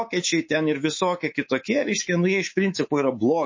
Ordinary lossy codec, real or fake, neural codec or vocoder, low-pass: MP3, 32 kbps; fake; codec, 24 kHz, 3.1 kbps, DualCodec; 7.2 kHz